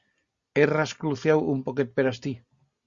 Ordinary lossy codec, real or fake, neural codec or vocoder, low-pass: Opus, 64 kbps; real; none; 7.2 kHz